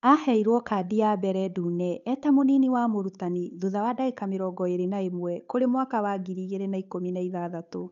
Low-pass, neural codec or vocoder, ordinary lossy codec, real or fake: 7.2 kHz; codec, 16 kHz, 8 kbps, FunCodec, trained on Chinese and English, 25 frames a second; none; fake